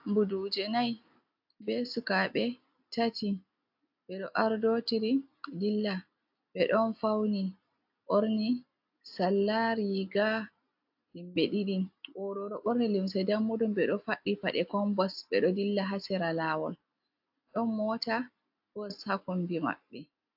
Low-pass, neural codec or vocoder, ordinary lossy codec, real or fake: 5.4 kHz; none; AAC, 48 kbps; real